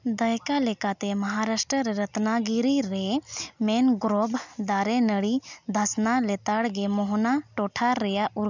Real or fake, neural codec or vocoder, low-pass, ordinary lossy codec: real; none; 7.2 kHz; none